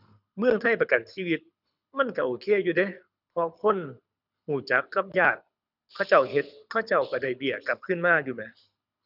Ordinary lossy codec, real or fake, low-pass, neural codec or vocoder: none; fake; 5.4 kHz; codec, 24 kHz, 6 kbps, HILCodec